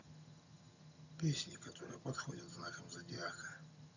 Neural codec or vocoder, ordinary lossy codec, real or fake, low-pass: vocoder, 22.05 kHz, 80 mel bands, HiFi-GAN; none; fake; 7.2 kHz